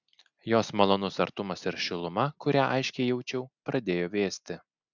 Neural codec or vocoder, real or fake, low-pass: none; real; 7.2 kHz